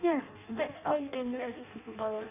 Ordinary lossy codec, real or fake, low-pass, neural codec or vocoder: none; fake; 3.6 kHz; codec, 16 kHz in and 24 kHz out, 0.6 kbps, FireRedTTS-2 codec